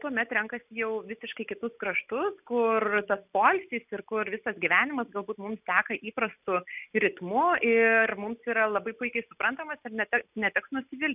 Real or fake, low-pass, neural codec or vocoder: real; 3.6 kHz; none